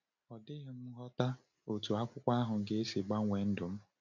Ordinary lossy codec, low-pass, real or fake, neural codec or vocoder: none; 7.2 kHz; real; none